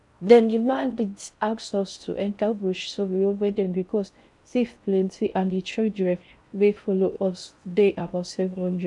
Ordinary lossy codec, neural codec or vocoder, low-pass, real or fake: none; codec, 16 kHz in and 24 kHz out, 0.6 kbps, FocalCodec, streaming, 4096 codes; 10.8 kHz; fake